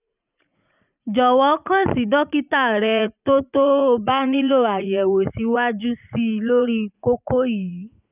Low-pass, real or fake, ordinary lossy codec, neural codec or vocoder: 3.6 kHz; fake; none; vocoder, 44.1 kHz, 80 mel bands, Vocos